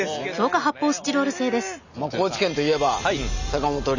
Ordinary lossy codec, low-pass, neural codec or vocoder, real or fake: none; 7.2 kHz; none; real